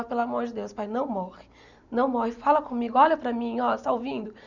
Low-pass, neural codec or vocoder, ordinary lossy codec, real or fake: 7.2 kHz; none; none; real